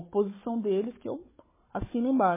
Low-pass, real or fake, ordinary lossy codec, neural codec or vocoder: 3.6 kHz; fake; MP3, 16 kbps; codec, 16 kHz, 16 kbps, FunCodec, trained on Chinese and English, 50 frames a second